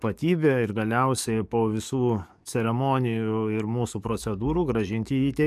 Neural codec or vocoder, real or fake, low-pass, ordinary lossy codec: codec, 44.1 kHz, 7.8 kbps, DAC; fake; 14.4 kHz; MP3, 96 kbps